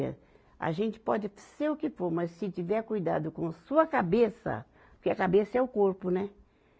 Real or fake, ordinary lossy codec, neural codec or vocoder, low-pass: real; none; none; none